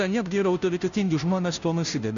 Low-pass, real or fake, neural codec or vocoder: 7.2 kHz; fake; codec, 16 kHz, 0.5 kbps, FunCodec, trained on Chinese and English, 25 frames a second